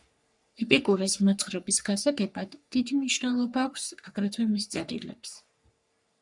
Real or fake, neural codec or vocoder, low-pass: fake; codec, 44.1 kHz, 3.4 kbps, Pupu-Codec; 10.8 kHz